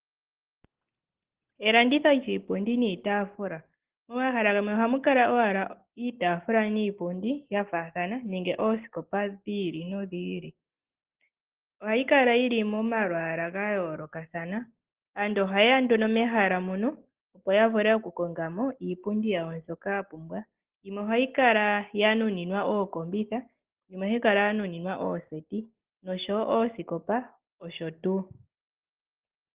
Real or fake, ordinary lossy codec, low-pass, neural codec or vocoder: real; Opus, 16 kbps; 3.6 kHz; none